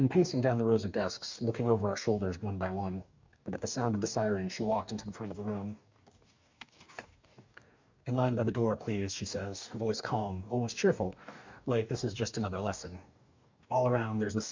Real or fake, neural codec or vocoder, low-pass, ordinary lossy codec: fake; codec, 44.1 kHz, 2.6 kbps, DAC; 7.2 kHz; MP3, 64 kbps